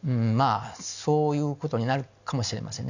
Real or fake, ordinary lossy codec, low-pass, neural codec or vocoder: real; none; 7.2 kHz; none